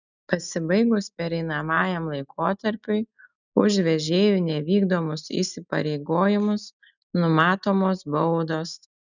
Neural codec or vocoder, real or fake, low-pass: none; real; 7.2 kHz